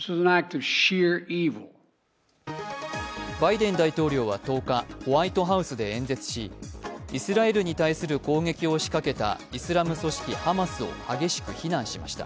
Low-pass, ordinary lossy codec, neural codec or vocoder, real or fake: none; none; none; real